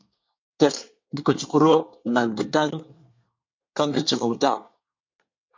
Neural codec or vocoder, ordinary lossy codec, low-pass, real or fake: codec, 24 kHz, 1 kbps, SNAC; MP3, 48 kbps; 7.2 kHz; fake